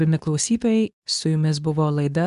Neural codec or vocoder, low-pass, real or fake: codec, 24 kHz, 0.9 kbps, WavTokenizer, medium speech release version 2; 10.8 kHz; fake